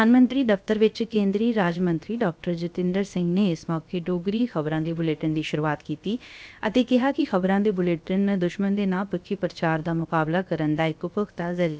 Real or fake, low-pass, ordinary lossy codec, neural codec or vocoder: fake; none; none; codec, 16 kHz, about 1 kbps, DyCAST, with the encoder's durations